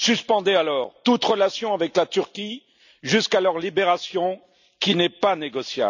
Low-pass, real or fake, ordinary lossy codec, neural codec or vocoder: 7.2 kHz; real; none; none